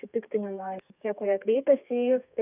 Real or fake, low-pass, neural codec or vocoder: fake; 3.6 kHz; codec, 32 kHz, 1.9 kbps, SNAC